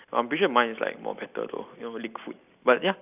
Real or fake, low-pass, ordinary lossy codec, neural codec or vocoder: real; 3.6 kHz; none; none